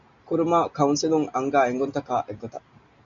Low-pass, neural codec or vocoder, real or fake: 7.2 kHz; none; real